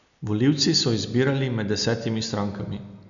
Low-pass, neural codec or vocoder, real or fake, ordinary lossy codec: 7.2 kHz; none; real; none